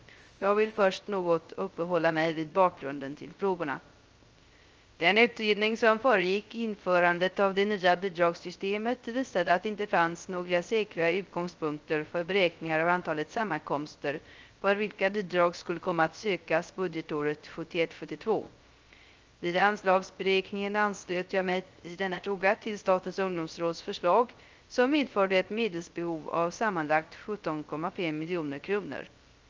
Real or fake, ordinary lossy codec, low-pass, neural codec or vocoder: fake; Opus, 24 kbps; 7.2 kHz; codec, 16 kHz, 0.3 kbps, FocalCodec